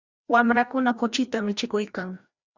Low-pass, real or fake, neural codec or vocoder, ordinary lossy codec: 7.2 kHz; fake; codec, 16 kHz, 1 kbps, FreqCodec, larger model; Opus, 64 kbps